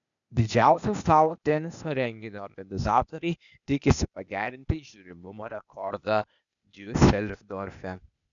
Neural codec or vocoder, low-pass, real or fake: codec, 16 kHz, 0.8 kbps, ZipCodec; 7.2 kHz; fake